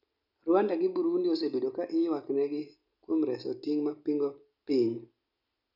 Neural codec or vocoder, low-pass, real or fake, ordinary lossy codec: none; 5.4 kHz; real; none